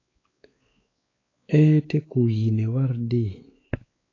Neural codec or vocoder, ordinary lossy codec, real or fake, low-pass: codec, 16 kHz, 4 kbps, X-Codec, WavLM features, trained on Multilingual LibriSpeech; AAC, 32 kbps; fake; 7.2 kHz